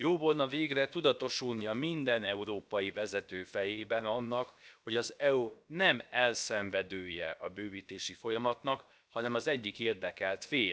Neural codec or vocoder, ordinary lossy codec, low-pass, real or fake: codec, 16 kHz, about 1 kbps, DyCAST, with the encoder's durations; none; none; fake